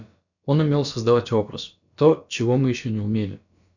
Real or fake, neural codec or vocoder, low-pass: fake; codec, 16 kHz, about 1 kbps, DyCAST, with the encoder's durations; 7.2 kHz